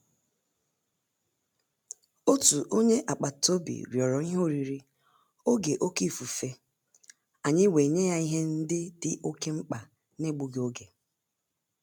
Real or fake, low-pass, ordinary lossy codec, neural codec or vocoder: real; 19.8 kHz; none; none